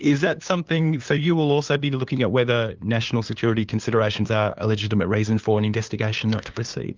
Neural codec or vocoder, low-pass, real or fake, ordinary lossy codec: codec, 16 kHz, 2 kbps, FunCodec, trained on LibriTTS, 25 frames a second; 7.2 kHz; fake; Opus, 24 kbps